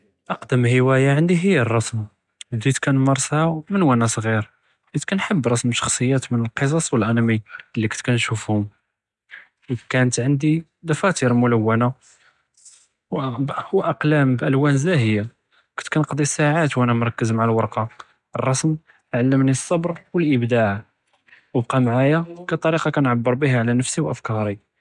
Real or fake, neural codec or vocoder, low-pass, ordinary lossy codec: real; none; 10.8 kHz; none